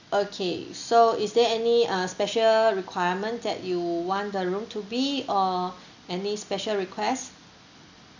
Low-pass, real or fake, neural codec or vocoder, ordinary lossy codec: 7.2 kHz; real; none; none